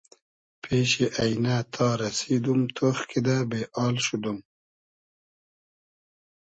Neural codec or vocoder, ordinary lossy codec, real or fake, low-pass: none; MP3, 32 kbps; real; 9.9 kHz